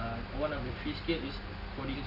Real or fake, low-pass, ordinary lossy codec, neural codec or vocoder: real; 5.4 kHz; none; none